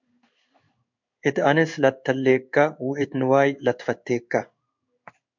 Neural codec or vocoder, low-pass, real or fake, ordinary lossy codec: codec, 16 kHz in and 24 kHz out, 1 kbps, XY-Tokenizer; 7.2 kHz; fake; MP3, 64 kbps